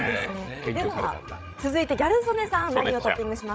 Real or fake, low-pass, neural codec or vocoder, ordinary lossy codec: fake; none; codec, 16 kHz, 16 kbps, FreqCodec, larger model; none